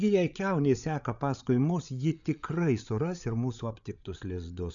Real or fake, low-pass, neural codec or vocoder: fake; 7.2 kHz; codec, 16 kHz, 8 kbps, FreqCodec, larger model